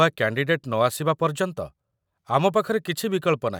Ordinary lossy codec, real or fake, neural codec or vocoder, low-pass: none; real; none; 19.8 kHz